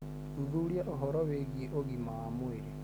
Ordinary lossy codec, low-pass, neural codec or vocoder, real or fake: none; none; none; real